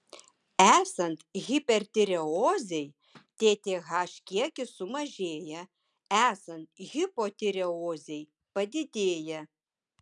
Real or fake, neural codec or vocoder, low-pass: real; none; 10.8 kHz